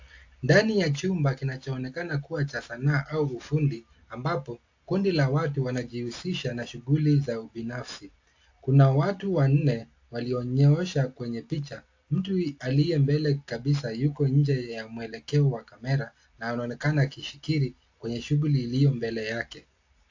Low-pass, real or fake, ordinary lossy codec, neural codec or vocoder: 7.2 kHz; real; AAC, 48 kbps; none